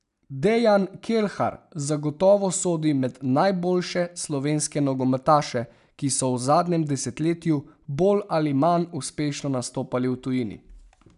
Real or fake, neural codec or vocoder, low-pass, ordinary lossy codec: real; none; 10.8 kHz; none